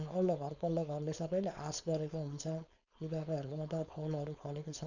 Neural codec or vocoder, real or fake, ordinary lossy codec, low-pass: codec, 16 kHz, 4.8 kbps, FACodec; fake; none; 7.2 kHz